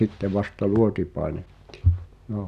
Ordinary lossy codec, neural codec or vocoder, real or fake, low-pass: none; none; real; 14.4 kHz